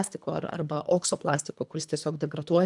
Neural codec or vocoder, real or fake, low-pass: codec, 24 kHz, 3 kbps, HILCodec; fake; 10.8 kHz